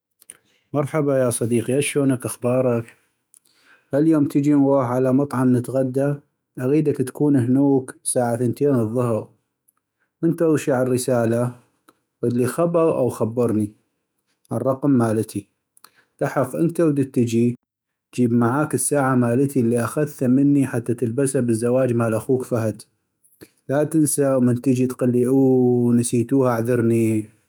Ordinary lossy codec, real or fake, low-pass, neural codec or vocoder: none; fake; none; autoencoder, 48 kHz, 128 numbers a frame, DAC-VAE, trained on Japanese speech